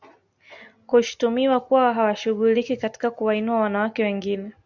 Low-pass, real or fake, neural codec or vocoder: 7.2 kHz; real; none